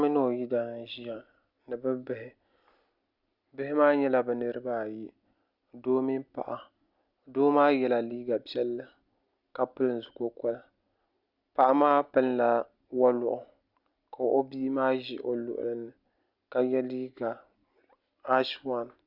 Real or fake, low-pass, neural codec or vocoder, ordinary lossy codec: real; 5.4 kHz; none; Opus, 64 kbps